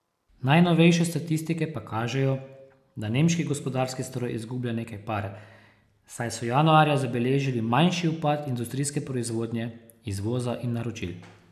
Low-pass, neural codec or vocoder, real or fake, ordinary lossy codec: 14.4 kHz; none; real; none